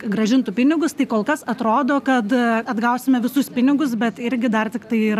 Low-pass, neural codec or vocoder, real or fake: 14.4 kHz; none; real